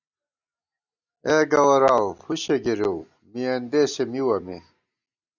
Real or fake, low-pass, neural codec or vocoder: real; 7.2 kHz; none